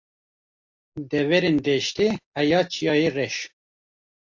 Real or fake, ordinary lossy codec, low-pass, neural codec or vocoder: real; AAC, 48 kbps; 7.2 kHz; none